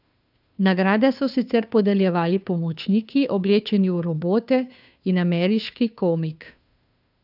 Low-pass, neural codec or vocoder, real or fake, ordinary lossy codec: 5.4 kHz; codec, 16 kHz, 2 kbps, FunCodec, trained on Chinese and English, 25 frames a second; fake; none